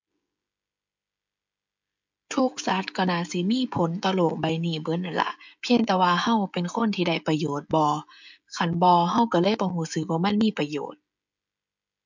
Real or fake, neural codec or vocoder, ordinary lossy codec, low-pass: fake; codec, 16 kHz, 16 kbps, FreqCodec, smaller model; MP3, 64 kbps; 7.2 kHz